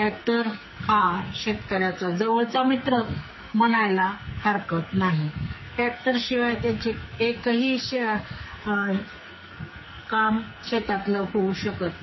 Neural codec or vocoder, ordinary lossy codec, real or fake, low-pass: codec, 44.1 kHz, 2.6 kbps, SNAC; MP3, 24 kbps; fake; 7.2 kHz